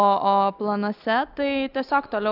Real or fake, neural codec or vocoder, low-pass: real; none; 5.4 kHz